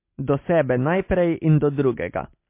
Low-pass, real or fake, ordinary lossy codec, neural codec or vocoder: 3.6 kHz; real; MP3, 24 kbps; none